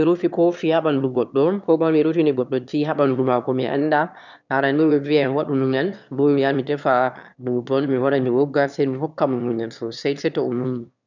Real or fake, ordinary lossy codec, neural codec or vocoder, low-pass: fake; none; autoencoder, 22.05 kHz, a latent of 192 numbers a frame, VITS, trained on one speaker; 7.2 kHz